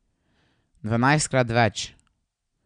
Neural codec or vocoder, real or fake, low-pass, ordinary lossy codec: none; real; 9.9 kHz; none